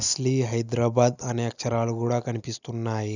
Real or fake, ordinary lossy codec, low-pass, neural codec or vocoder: real; none; 7.2 kHz; none